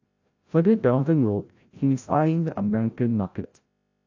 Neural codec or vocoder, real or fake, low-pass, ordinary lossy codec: codec, 16 kHz, 0.5 kbps, FreqCodec, larger model; fake; 7.2 kHz; AAC, 48 kbps